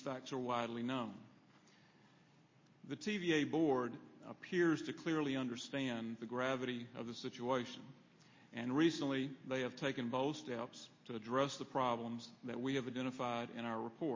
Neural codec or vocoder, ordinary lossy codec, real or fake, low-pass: none; MP3, 32 kbps; real; 7.2 kHz